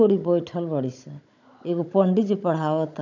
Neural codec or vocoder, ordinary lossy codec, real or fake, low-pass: codec, 16 kHz, 16 kbps, FunCodec, trained on Chinese and English, 50 frames a second; none; fake; 7.2 kHz